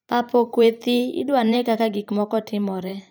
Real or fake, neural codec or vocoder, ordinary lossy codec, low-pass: fake; vocoder, 44.1 kHz, 128 mel bands every 512 samples, BigVGAN v2; none; none